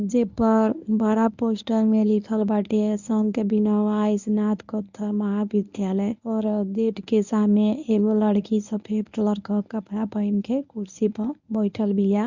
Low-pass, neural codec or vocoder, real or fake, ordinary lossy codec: 7.2 kHz; codec, 24 kHz, 0.9 kbps, WavTokenizer, medium speech release version 1; fake; none